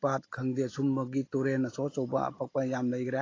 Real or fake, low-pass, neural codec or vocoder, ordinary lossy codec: real; 7.2 kHz; none; AAC, 32 kbps